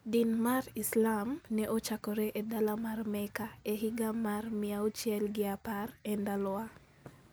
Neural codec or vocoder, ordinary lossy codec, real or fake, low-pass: none; none; real; none